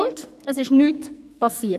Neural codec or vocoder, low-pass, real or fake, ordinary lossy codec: codec, 44.1 kHz, 2.6 kbps, SNAC; 14.4 kHz; fake; none